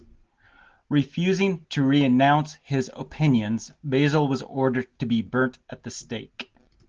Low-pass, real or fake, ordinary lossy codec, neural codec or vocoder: 7.2 kHz; real; Opus, 16 kbps; none